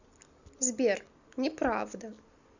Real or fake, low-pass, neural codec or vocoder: real; 7.2 kHz; none